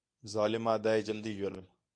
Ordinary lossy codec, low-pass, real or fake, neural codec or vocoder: MP3, 64 kbps; 9.9 kHz; fake; codec, 24 kHz, 0.9 kbps, WavTokenizer, medium speech release version 1